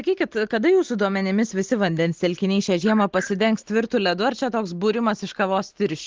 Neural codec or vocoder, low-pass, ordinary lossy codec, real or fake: none; 7.2 kHz; Opus, 16 kbps; real